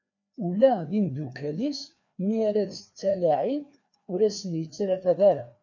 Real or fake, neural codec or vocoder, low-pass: fake; codec, 16 kHz, 2 kbps, FreqCodec, larger model; 7.2 kHz